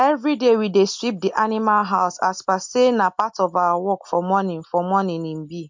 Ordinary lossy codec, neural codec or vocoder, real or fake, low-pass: MP3, 48 kbps; none; real; 7.2 kHz